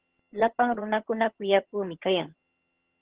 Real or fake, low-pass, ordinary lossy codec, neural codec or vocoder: fake; 3.6 kHz; Opus, 16 kbps; vocoder, 22.05 kHz, 80 mel bands, HiFi-GAN